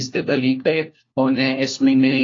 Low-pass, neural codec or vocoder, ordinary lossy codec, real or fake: 7.2 kHz; codec, 16 kHz, 1 kbps, FunCodec, trained on LibriTTS, 50 frames a second; AAC, 32 kbps; fake